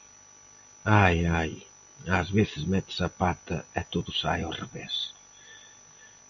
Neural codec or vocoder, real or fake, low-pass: none; real; 7.2 kHz